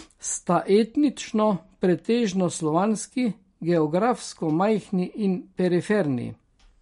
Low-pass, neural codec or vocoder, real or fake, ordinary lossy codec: 10.8 kHz; none; real; MP3, 48 kbps